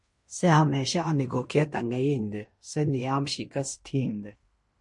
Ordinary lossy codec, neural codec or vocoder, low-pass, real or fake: MP3, 48 kbps; codec, 16 kHz in and 24 kHz out, 0.9 kbps, LongCat-Audio-Codec, fine tuned four codebook decoder; 10.8 kHz; fake